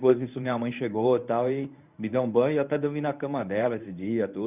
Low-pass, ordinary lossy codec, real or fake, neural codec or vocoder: 3.6 kHz; none; fake; codec, 24 kHz, 0.9 kbps, WavTokenizer, medium speech release version 1